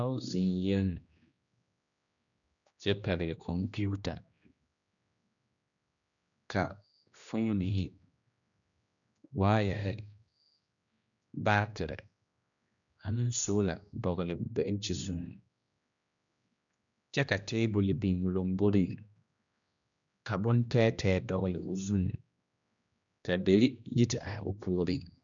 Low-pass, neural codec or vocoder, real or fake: 7.2 kHz; codec, 16 kHz, 1 kbps, X-Codec, HuBERT features, trained on general audio; fake